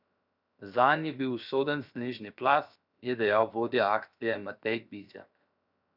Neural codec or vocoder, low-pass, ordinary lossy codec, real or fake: codec, 16 kHz, 0.7 kbps, FocalCodec; 5.4 kHz; none; fake